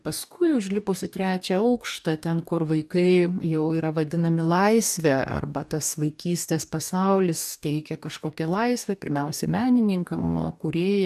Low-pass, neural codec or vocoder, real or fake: 14.4 kHz; codec, 44.1 kHz, 2.6 kbps, DAC; fake